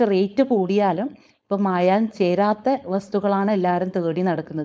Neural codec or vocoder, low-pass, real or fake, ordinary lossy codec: codec, 16 kHz, 4.8 kbps, FACodec; none; fake; none